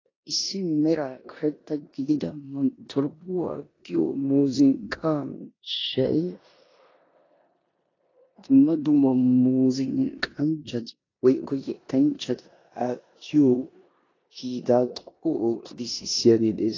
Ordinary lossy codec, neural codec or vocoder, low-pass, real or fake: AAC, 32 kbps; codec, 16 kHz in and 24 kHz out, 0.9 kbps, LongCat-Audio-Codec, four codebook decoder; 7.2 kHz; fake